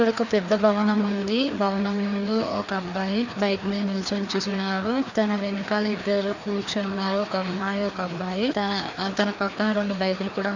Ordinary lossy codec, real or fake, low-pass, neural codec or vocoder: none; fake; 7.2 kHz; codec, 16 kHz, 2 kbps, FreqCodec, larger model